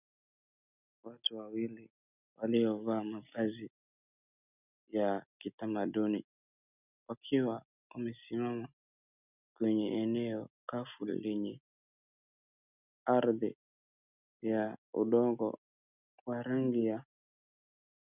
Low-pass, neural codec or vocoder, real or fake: 3.6 kHz; none; real